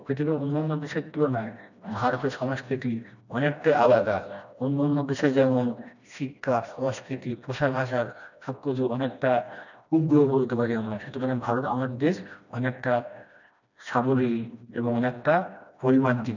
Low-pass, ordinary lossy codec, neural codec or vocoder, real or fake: 7.2 kHz; none; codec, 16 kHz, 1 kbps, FreqCodec, smaller model; fake